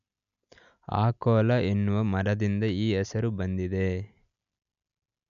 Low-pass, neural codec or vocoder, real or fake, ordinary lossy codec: 7.2 kHz; none; real; none